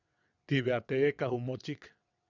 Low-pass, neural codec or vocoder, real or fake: 7.2 kHz; vocoder, 22.05 kHz, 80 mel bands, WaveNeXt; fake